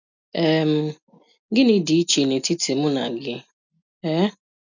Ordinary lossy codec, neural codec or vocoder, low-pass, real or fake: none; none; 7.2 kHz; real